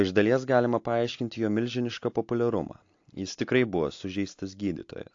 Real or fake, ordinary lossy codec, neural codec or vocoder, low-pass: real; AAC, 48 kbps; none; 7.2 kHz